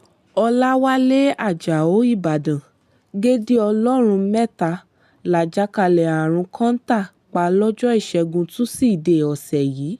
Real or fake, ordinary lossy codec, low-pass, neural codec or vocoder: real; none; 14.4 kHz; none